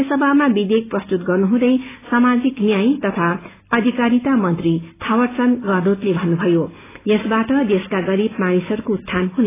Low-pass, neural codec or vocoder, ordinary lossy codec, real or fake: 3.6 kHz; none; AAC, 16 kbps; real